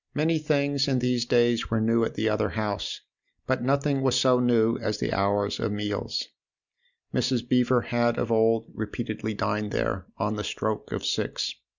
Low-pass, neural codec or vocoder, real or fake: 7.2 kHz; none; real